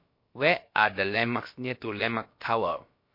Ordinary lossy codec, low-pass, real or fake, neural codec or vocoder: MP3, 32 kbps; 5.4 kHz; fake; codec, 16 kHz, about 1 kbps, DyCAST, with the encoder's durations